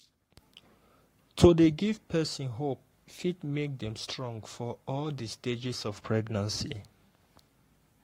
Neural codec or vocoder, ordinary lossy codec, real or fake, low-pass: codec, 44.1 kHz, 7.8 kbps, Pupu-Codec; AAC, 48 kbps; fake; 19.8 kHz